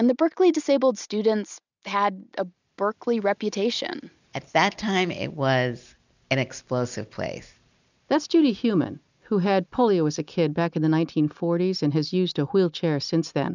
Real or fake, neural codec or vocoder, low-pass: real; none; 7.2 kHz